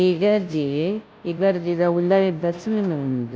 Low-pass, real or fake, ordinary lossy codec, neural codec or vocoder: none; fake; none; codec, 16 kHz, 0.5 kbps, FunCodec, trained on Chinese and English, 25 frames a second